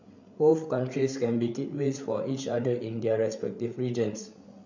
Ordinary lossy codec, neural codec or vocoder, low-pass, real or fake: none; codec, 16 kHz, 8 kbps, FreqCodec, larger model; 7.2 kHz; fake